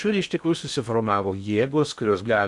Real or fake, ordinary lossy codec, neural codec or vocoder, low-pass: fake; MP3, 96 kbps; codec, 16 kHz in and 24 kHz out, 0.6 kbps, FocalCodec, streaming, 2048 codes; 10.8 kHz